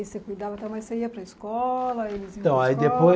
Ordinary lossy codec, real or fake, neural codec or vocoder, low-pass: none; real; none; none